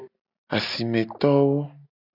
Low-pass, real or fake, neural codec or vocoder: 5.4 kHz; real; none